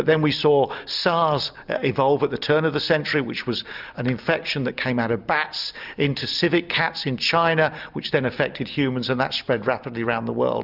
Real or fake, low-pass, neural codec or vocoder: real; 5.4 kHz; none